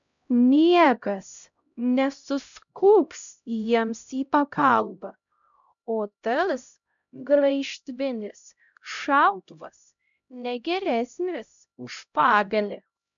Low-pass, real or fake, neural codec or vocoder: 7.2 kHz; fake; codec, 16 kHz, 0.5 kbps, X-Codec, HuBERT features, trained on LibriSpeech